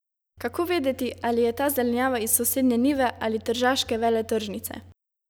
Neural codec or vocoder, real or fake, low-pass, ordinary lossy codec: none; real; none; none